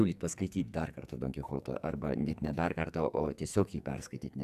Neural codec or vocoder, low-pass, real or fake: codec, 44.1 kHz, 2.6 kbps, SNAC; 14.4 kHz; fake